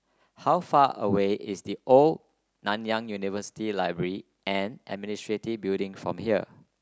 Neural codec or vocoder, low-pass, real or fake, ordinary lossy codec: none; none; real; none